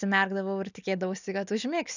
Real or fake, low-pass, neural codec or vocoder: real; 7.2 kHz; none